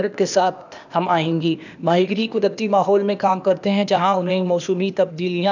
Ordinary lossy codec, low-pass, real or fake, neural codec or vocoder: none; 7.2 kHz; fake; codec, 16 kHz, 0.8 kbps, ZipCodec